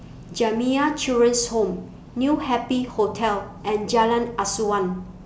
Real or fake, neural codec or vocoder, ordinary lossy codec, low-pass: real; none; none; none